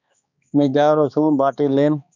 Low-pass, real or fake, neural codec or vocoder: 7.2 kHz; fake; codec, 16 kHz, 2 kbps, X-Codec, HuBERT features, trained on balanced general audio